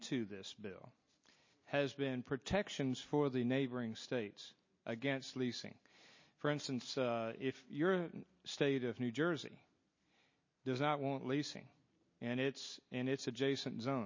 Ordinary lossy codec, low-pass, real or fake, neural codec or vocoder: MP3, 32 kbps; 7.2 kHz; real; none